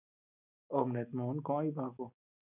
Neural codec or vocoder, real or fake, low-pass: none; real; 3.6 kHz